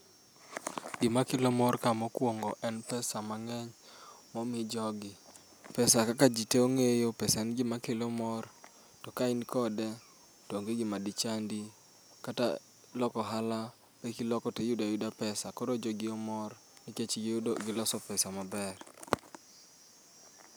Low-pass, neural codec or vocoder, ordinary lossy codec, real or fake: none; none; none; real